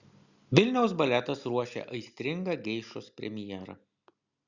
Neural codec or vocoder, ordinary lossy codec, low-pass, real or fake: none; Opus, 64 kbps; 7.2 kHz; real